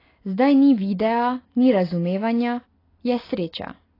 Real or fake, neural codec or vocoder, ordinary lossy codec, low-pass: real; none; AAC, 24 kbps; 5.4 kHz